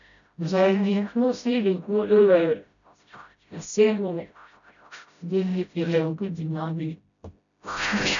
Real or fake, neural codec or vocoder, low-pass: fake; codec, 16 kHz, 0.5 kbps, FreqCodec, smaller model; 7.2 kHz